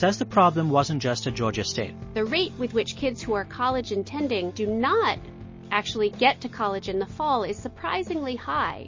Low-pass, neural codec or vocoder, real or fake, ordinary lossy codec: 7.2 kHz; none; real; MP3, 32 kbps